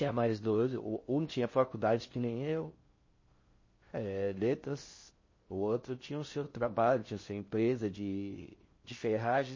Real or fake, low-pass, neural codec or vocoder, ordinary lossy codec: fake; 7.2 kHz; codec, 16 kHz in and 24 kHz out, 0.6 kbps, FocalCodec, streaming, 2048 codes; MP3, 32 kbps